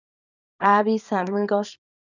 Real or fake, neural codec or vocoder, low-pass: fake; codec, 24 kHz, 0.9 kbps, WavTokenizer, small release; 7.2 kHz